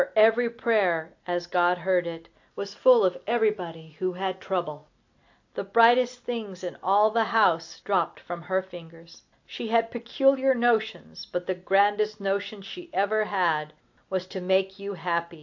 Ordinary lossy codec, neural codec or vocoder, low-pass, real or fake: AAC, 48 kbps; none; 7.2 kHz; real